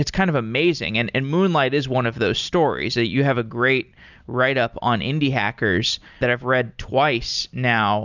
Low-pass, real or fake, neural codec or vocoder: 7.2 kHz; real; none